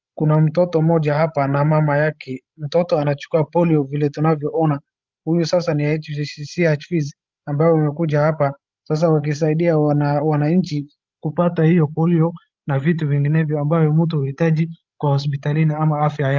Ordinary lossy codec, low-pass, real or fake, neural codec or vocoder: Opus, 32 kbps; 7.2 kHz; fake; codec, 16 kHz, 16 kbps, FreqCodec, larger model